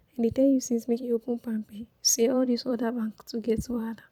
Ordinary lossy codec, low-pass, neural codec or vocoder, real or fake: none; 19.8 kHz; vocoder, 44.1 kHz, 128 mel bands every 256 samples, BigVGAN v2; fake